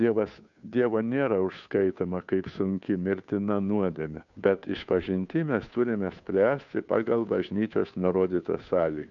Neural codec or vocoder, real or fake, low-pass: codec, 16 kHz, 2 kbps, FunCodec, trained on Chinese and English, 25 frames a second; fake; 7.2 kHz